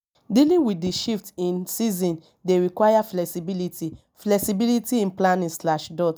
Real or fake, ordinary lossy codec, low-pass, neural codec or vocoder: real; none; none; none